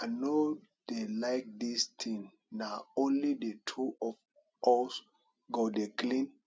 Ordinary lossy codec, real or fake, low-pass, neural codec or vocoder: none; real; none; none